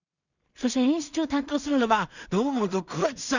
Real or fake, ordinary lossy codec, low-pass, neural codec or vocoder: fake; none; 7.2 kHz; codec, 16 kHz in and 24 kHz out, 0.4 kbps, LongCat-Audio-Codec, two codebook decoder